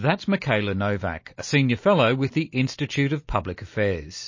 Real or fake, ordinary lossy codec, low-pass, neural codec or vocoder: real; MP3, 32 kbps; 7.2 kHz; none